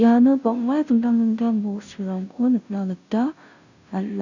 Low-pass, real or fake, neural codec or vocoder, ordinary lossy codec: 7.2 kHz; fake; codec, 16 kHz, 0.5 kbps, FunCodec, trained on Chinese and English, 25 frames a second; none